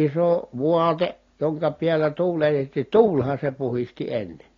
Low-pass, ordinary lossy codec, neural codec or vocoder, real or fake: 7.2 kHz; AAC, 32 kbps; none; real